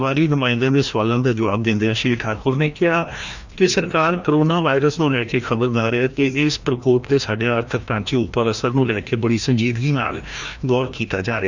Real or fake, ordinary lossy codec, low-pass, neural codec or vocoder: fake; Opus, 64 kbps; 7.2 kHz; codec, 16 kHz, 1 kbps, FreqCodec, larger model